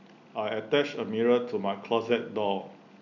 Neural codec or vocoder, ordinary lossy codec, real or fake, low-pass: none; none; real; 7.2 kHz